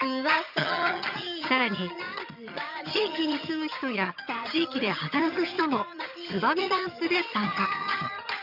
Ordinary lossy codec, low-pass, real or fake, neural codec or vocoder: none; 5.4 kHz; fake; vocoder, 22.05 kHz, 80 mel bands, HiFi-GAN